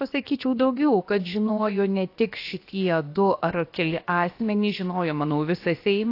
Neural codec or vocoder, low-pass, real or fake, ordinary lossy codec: codec, 16 kHz, about 1 kbps, DyCAST, with the encoder's durations; 5.4 kHz; fake; AAC, 32 kbps